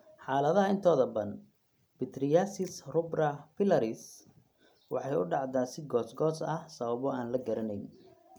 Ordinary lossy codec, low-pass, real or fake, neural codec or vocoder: none; none; real; none